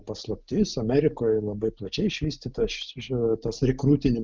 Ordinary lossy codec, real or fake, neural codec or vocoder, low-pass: Opus, 24 kbps; real; none; 7.2 kHz